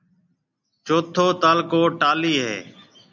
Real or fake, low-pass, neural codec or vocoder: real; 7.2 kHz; none